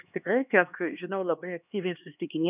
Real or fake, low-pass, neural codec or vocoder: fake; 3.6 kHz; codec, 16 kHz, 2 kbps, X-Codec, HuBERT features, trained on LibriSpeech